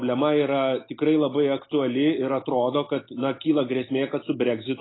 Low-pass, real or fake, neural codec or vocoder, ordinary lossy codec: 7.2 kHz; real; none; AAC, 16 kbps